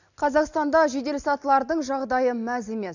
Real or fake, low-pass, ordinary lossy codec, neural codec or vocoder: real; 7.2 kHz; none; none